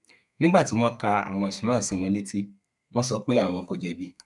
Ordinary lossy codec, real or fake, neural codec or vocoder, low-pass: none; fake; codec, 32 kHz, 1.9 kbps, SNAC; 10.8 kHz